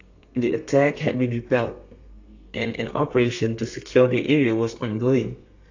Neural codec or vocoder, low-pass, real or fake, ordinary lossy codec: codec, 32 kHz, 1.9 kbps, SNAC; 7.2 kHz; fake; AAC, 48 kbps